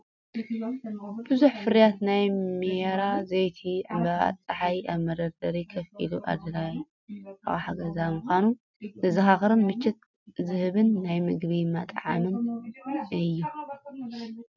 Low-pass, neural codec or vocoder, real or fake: 7.2 kHz; none; real